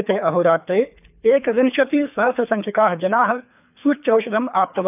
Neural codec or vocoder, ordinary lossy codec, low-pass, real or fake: codec, 24 kHz, 3 kbps, HILCodec; none; 3.6 kHz; fake